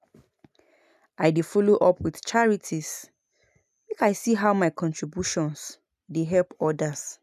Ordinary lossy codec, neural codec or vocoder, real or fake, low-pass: none; none; real; 14.4 kHz